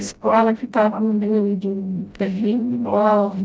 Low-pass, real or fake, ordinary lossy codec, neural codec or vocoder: none; fake; none; codec, 16 kHz, 0.5 kbps, FreqCodec, smaller model